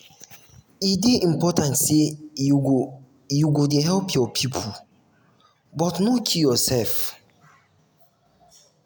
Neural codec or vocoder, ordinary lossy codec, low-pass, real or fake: none; none; none; real